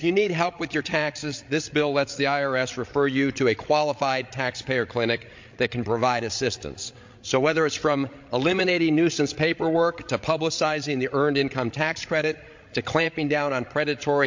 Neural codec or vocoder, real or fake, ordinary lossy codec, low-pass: codec, 16 kHz, 16 kbps, FreqCodec, larger model; fake; MP3, 48 kbps; 7.2 kHz